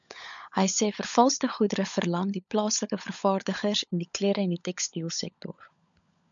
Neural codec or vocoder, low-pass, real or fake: codec, 16 kHz, 16 kbps, FunCodec, trained on LibriTTS, 50 frames a second; 7.2 kHz; fake